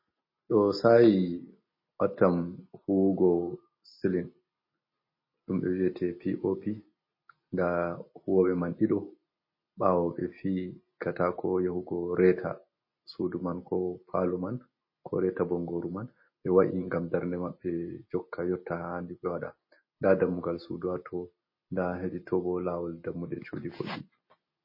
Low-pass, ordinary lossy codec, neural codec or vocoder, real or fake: 5.4 kHz; MP3, 24 kbps; none; real